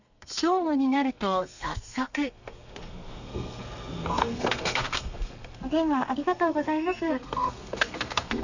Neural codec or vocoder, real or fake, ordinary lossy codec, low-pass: codec, 32 kHz, 1.9 kbps, SNAC; fake; none; 7.2 kHz